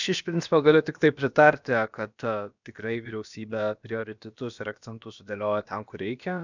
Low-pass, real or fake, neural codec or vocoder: 7.2 kHz; fake; codec, 16 kHz, about 1 kbps, DyCAST, with the encoder's durations